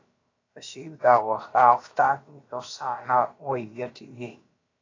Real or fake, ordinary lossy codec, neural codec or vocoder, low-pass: fake; AAC, 32 kbps; codec, 16 kHz, about 1 kbps, DyCAST, with the encoder's durations; 7.2 kHz